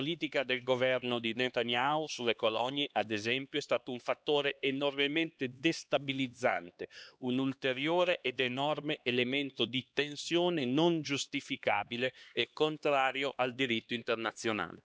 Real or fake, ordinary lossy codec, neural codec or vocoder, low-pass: fake; none; codec, 16 kHz, 2 kbps, X-Codec, HuBERT features, trained on LibriSpeech; none